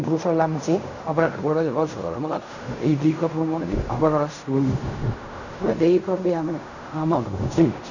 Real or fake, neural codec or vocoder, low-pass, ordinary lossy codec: fake; codec, 16 kHz in and 24 kHz out, 0.4 kbps, LongCat-Audio-Codec, fine tuned four codebook decoder; 7.2 kHz; none